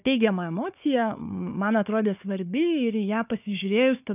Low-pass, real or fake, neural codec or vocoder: 3.6 kHz; fake; codec, 16 kHz, 4 kbps, FunCodec, trained on Chinese and English, 50 frames a second